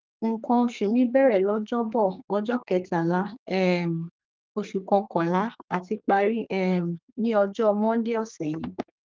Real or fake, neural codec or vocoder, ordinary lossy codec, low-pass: fake; codec, 32 kHz, 1.9 kbps, SNAC; Opus, 32 kbps; 7.2 kHz